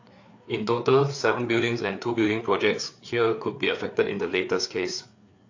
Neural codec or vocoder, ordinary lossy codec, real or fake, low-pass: codec, 16 kHz, 4 kbps, FreqCodec, larger model; AAC, 48 kbps; fake; 7.2 kHz